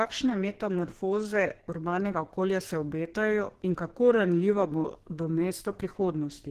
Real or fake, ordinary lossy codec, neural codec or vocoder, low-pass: fake; Opus, 16 kbps; codec, 44.1 kHz, 2.6 kbps, SNAC; 14.4 kHz